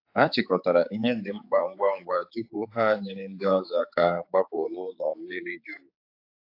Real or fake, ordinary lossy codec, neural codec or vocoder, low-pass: fake; AAC, 32 kbps; codec, 16 kHz, 4 kbps, X-Codec, HuBERT features, trained on general audio; 5.4 kHz